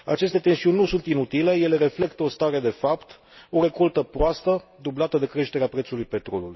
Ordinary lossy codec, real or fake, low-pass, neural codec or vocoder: MP3, 24 kbps; real; 7.2 kHz; none